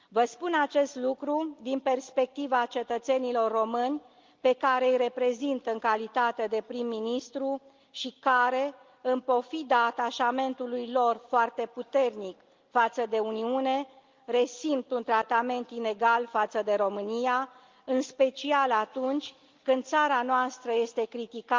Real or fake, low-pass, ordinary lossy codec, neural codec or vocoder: real; 7.2 kHz; Opus, 32 kbps; none